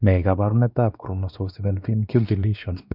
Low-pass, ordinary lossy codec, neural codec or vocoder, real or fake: 5.4 kHz; none; codec, 16 kHz, 2 kbps, X-Codec, WavLM features, trained on Multilingual LibriSpeech; fake